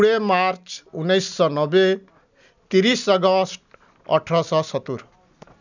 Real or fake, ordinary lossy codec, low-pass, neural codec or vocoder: real; none; 7.2 kHz; none